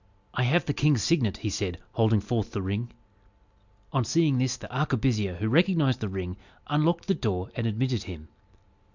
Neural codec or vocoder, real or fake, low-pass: none; real; 7.2 kHz